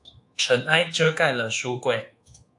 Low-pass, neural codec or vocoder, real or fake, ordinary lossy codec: 10.8 kHz; codec, 24 kHz, 1.2 kbps, DualCodec; fake; AAC, 64 kbps